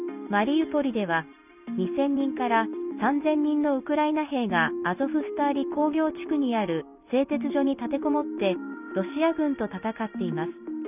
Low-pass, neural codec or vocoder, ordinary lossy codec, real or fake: 3.6 kHz; none; none; real